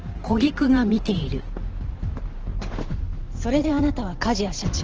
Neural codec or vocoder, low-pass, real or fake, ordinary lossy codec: vocoder, 44.1 kHz, 128 mel bands every 512 samples, BigVGAN v2; 7.2 kHz; fake; Opus, 16 kbps